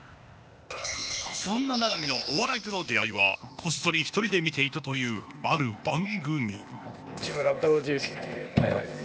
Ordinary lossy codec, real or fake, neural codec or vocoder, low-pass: none; fake; codec, 16 kHz, 0.8 kbps, ZipCodec; none